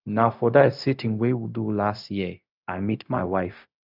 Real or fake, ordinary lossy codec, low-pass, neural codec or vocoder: fake; none; 5.4 kHz; codec, 16 kHz, 0.4 kbps, LongCat-Audio-Codec